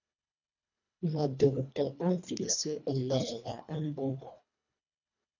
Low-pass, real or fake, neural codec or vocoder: 7.2 kHz; fake; codec, 24 kHz, 1.5 kbps, HILCodec